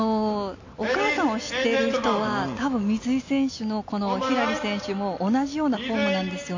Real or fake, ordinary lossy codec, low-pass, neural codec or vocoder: real; AAC, 48 kbps; 7.2 kHz; none